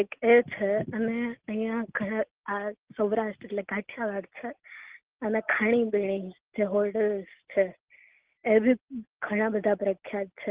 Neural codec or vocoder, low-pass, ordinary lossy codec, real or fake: none; 3.6 kHz; Opus, 16 kbps; real